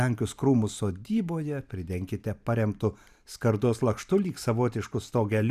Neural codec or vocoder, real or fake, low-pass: vocoder, 44.1 kHz, 128 mel bands every 512 samples, BigVGAN v2; fake; 14.4 kHz